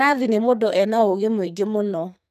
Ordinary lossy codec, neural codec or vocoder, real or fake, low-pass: none; codec, 44.1 kHz, 2.6 kbps, SNAC; fake; 14.4 kHz